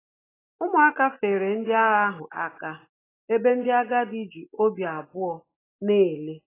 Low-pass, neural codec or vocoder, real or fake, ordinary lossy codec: 3.6 kHz; none; real; AAC, 16 kbps